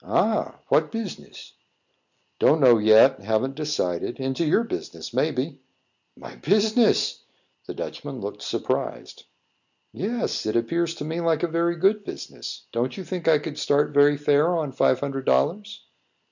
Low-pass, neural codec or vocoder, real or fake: 7.2 kHz; none; real